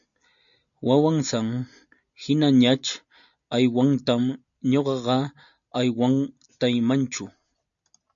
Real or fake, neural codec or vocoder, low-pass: real; none; 7.2 kHz